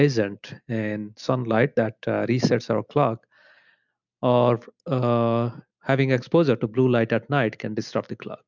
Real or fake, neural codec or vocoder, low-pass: real; none; 7.2 kHz